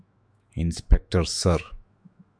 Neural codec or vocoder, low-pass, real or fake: autoencoder, 48 kHz, 128 numbers a frame, DAC-VAE, trained on Japanese speech; 9.9 kHz; fake